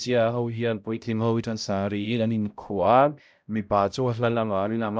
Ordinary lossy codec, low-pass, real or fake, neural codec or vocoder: none; none; fake; codec, 16 kHz, 0.5 kbps, X-Codec, HuBERT features, trained on balanced general audio